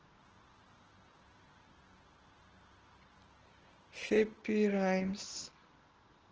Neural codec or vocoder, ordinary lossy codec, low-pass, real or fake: none; Opus, 16 kbps; 7.2 kHz; real